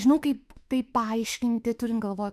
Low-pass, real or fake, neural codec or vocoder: 14.4 kHz; fake; autoencoder, 48 kHz, 32 numbers a frame, DAC-VAE, trained on Japanese speech